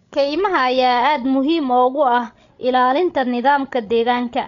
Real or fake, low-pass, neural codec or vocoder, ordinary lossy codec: fake; 7.2 kHz; codec, 16 kHz, 16 kbps, FreqCodec, larger model; none